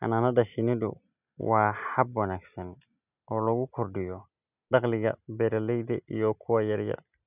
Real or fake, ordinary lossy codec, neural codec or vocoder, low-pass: real; none; none; 3.6 kHz